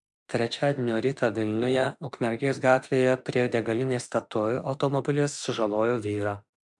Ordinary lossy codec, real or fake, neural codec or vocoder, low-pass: MP3, 96 kbps; fake; autoencoder, 48 kHz, 32 numbers a frame, DAC-VAE, trained on Japanese speech; 10.8 kHz